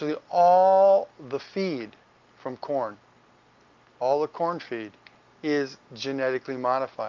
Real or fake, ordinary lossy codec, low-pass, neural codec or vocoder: real; Opus, 24 kbps; 7.2 kHz; none